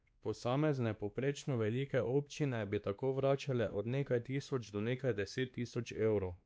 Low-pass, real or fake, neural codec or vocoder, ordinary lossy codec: none; fake; codec, 16 kHz, 2 kbps, X-Codec, WavLM features, trained on Multilingual LibriSpeech; none